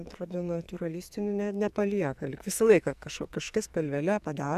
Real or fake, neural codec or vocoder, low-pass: fake; codec, 44.1 kHz, 2.6 kbps, SNAC; 14.4 kHz